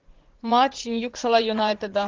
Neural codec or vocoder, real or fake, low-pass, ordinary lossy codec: vocoder, 44.1 kHz, 80 mel bands, Vocos; fake; 7.2 kHz; Opus, 16 kbps